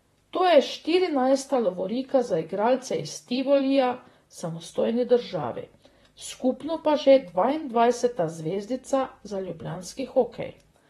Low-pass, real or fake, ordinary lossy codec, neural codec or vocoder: 19.8 kHz; fake; AAC, 32 kbps; vocoder, 44.1 kHz, 128 mel bands, Pupu-Vocoder